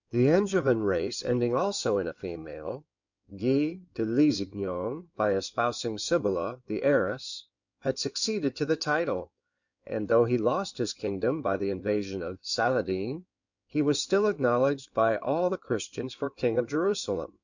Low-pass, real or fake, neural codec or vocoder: 7.2 kHz; fake; codec, 16 kHz in and 24 kHz out, 2.2 kbps, FireRedTTS-2 codec